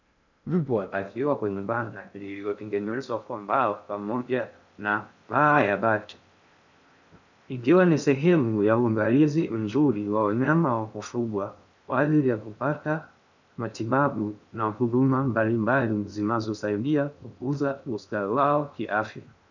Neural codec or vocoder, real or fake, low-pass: codec, 16 kHz in and 24 kHz out, 0.6 kbps, FocalCodec, streaming, 2048 codes; fake; 7.2 kHz